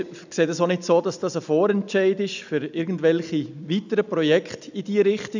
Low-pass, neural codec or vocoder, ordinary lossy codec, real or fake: 7.2 kHz; none; none; real